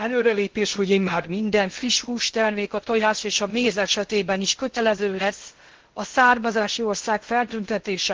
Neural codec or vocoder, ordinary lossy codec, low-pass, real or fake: codec, 16 kHz in and 24 kHz out, 0.6 kbps, FocalCodec, streaming, 2048 codes; Opus, 16 kbps; 7.2 kHz; fake